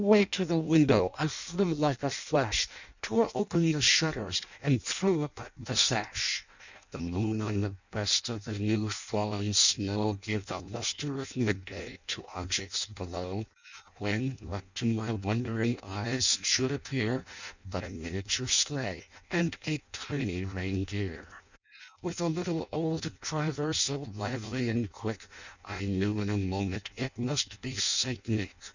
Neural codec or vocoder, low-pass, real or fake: codec, 16 kHz in and 24 kHz out, 0.6 kbps, FireRedTTS-2 codec; 7.2 kHz; fake